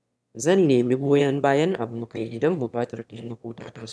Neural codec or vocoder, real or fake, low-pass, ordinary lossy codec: autoencoder, 22.05 kHz, a latent of 192 numbers a frame, VITS, trained on one speaker; fake; none; none